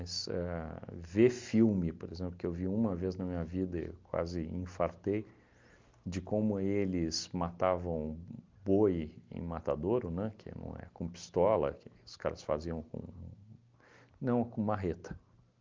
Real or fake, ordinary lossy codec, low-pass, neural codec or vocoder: real; Opus, 32 kbps; 7.2 kHz; none